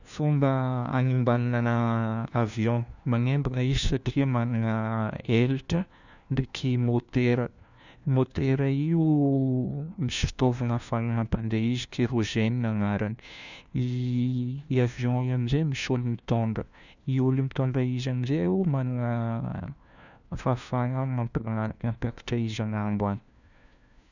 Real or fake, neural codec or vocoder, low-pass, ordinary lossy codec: fake; codec, 16 kHz, 1 kbps, FunCodec, trained on LibriTTS, 50 frames a second; 7.2 kHz; none